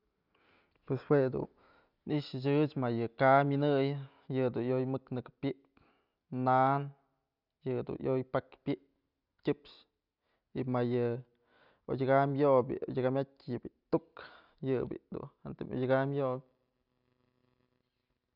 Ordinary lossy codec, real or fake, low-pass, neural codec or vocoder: none; real; 5.4 kHz; none